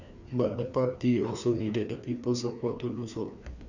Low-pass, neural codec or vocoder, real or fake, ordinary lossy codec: 7.2 kHz; codec, 16 kHz, 2 kbps, FreqCodec, larger model; fake; none